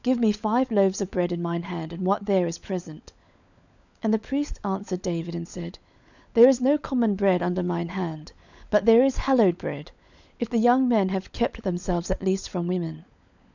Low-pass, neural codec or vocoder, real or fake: 7.2 kHz; codec, 16 kHz, 16 kbps, FunCodec, trained on LibriTTS, 50 frames a second; fake